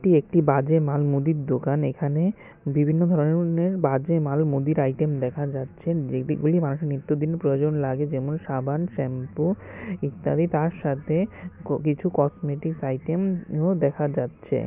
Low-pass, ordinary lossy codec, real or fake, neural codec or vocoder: 3.6 kHz; none; real; none